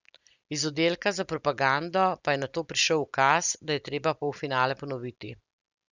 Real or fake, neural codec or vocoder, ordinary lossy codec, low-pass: real; none; Opus, 64 kbps; 7.2 kHz